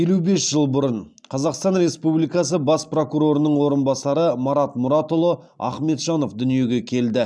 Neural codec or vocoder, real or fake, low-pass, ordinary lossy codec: none; real; none; none